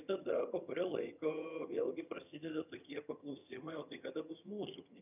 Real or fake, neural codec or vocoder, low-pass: fake; vocoder, 22.05 kHz, 80 mel bands, HiFi-GAN; 3.6 kHz